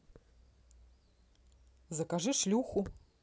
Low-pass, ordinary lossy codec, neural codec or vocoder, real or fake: none; none; none; real